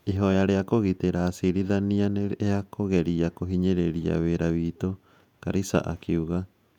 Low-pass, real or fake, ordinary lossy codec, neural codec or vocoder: 19.8 kHz; fake; none; vocoder, 48 kHz, 128 mel bands, Vocos